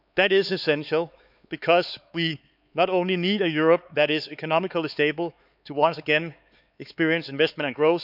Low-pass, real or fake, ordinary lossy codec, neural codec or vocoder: 5.4 kHz; fake; none; codec, 16 kHz, 4 kbps, X-Codec, HuBERT features, trained on LibriSpeech